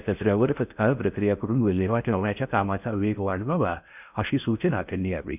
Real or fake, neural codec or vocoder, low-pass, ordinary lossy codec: fake; codec, 16 kHz in and 24 kHz out, 0.6 kbps, FocalCodec, streaming, 4096 codes; 3.6 kHz; none